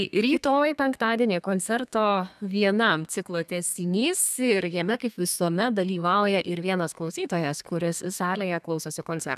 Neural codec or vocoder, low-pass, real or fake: codec, 32 kHz, 1.9 kbps, SNAC; 14.4 kHz; fake